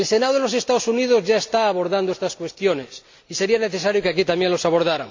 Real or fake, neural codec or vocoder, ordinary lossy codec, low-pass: real; none; MP3, 48 kbps; 7.2 kHz